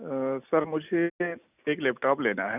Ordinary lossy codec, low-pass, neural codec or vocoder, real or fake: none; 3.6 kHz; none; real